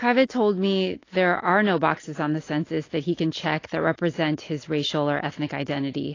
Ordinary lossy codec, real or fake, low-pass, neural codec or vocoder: AAC, 32 kbps; real; 7.2 kHz; none